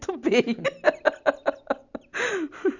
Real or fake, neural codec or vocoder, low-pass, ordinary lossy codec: real; none; 7.2 kHz; none